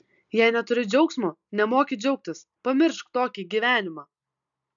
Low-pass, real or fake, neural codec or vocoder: 7.2 kHz; real; none